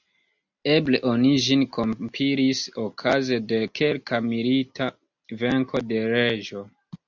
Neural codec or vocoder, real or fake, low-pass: none; real; 7.2 kHz